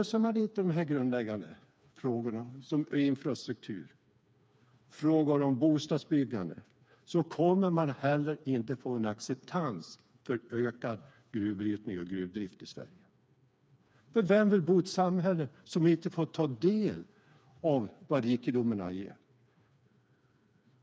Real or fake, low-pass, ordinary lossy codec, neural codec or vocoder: fake; none; none; codec, 16 kHz, 4 kbps, FreqCodec, smaller model